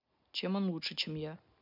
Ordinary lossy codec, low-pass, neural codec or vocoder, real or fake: none; 5.4 kHz; none; real